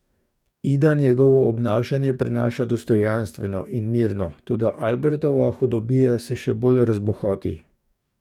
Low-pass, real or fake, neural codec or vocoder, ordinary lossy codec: 19.8 kHz; fake; codec, 44.1 kHz, 2.6 kbps, DAC; none